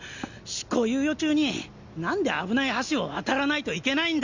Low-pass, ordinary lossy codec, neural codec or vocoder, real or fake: 7.2 kHz; Opus, 64 kbps; none; real